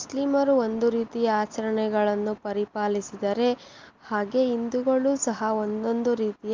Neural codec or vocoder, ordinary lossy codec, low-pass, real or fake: none; Opus, 32 kbps; 7.2 kHz; real